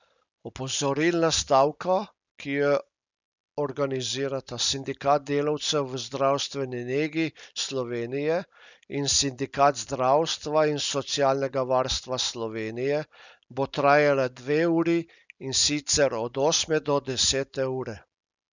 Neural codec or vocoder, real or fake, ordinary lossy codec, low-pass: none; real; none; 7.2 kHz